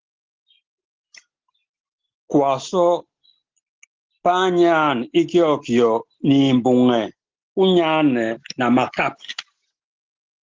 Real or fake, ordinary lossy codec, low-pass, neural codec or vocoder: real; Opus, 16 kbps; 7.2 kHz; none